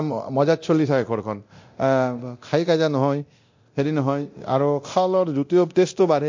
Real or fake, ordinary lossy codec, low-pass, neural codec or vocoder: fake; MP3, 48 kbps; 7.2 kHz; codec, 24 kHz, 0.9 kbps, DualCodec